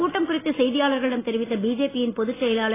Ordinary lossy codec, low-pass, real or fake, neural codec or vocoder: AAC, 16 kbps; 3.6 kHz; real; none